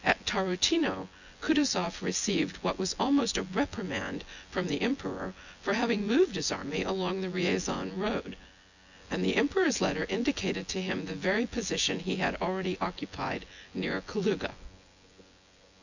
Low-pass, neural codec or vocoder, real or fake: 7.2 kHz; vocoder, 24 kHz, 100 mel bands, Vocos; fake